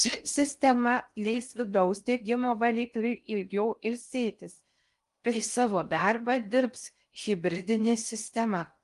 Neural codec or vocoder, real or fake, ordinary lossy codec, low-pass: codec, 16 kHz in and 24 kHz out, 0.6 kbps, FocalCodec, streaming, 4096 codes; fake; Opus, 32 kbps; 10.8 kHz